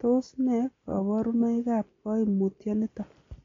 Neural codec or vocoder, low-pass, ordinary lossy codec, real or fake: none; 7.2 kHz; AAC, 32 kbps; real